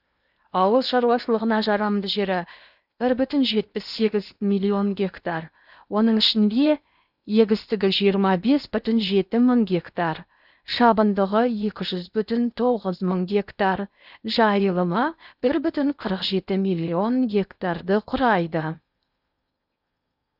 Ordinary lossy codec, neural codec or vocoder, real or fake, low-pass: none; codec, 16 kHz in and 24 kHz out, 0.8 kbps, FocalCodec, streaming, 65536 codes; fake; 5.4 kHz